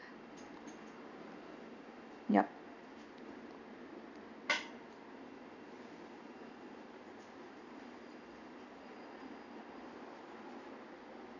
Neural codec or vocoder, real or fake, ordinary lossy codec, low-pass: none; real; none; 7.2 kHz